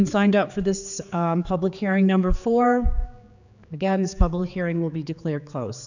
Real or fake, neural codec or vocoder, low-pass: fake; codec, 16 kHz, 4 kbps, X-Codec, HuBERT features, trained on general audio; 7.2 kHz